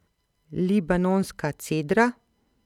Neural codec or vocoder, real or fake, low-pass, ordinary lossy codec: none; real; 19.8 kHz; none